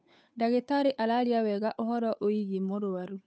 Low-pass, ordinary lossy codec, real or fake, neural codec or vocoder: none; none; fake; codec, 16 kHz, 8 kbps, FunCodec, trained on Chinese and English, 25 frames a second